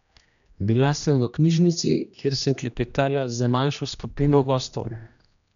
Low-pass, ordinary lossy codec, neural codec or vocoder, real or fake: 7.2 kHz; none; codec, 16 kHz, 1 kbps, X-Codec, HuBERT features, trained on general audio; fake